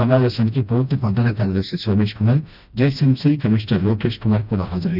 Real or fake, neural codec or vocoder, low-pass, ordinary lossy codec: fake; codec, 16 kHz, 1 kbps, FreqCodec, smaller model; 5.4 kHz; none